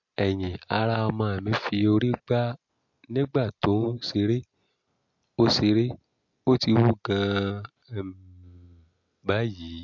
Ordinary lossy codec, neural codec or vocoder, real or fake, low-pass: MP3, 48 kbps; none; real; 7.2 kHz